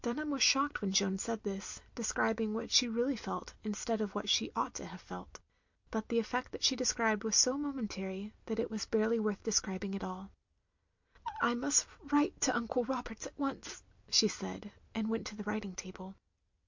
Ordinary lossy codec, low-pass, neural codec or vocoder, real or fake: MP3, 48 kbps; 7.2 kHz; none; real